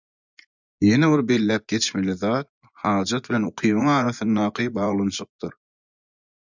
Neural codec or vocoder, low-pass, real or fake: vocoder, 24 kHz, 100 mel bands, Vocos; 7.2 kHz; fake